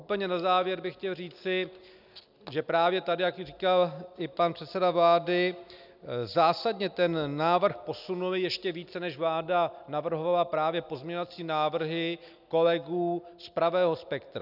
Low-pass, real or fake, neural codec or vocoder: 5.4 kHz; real; none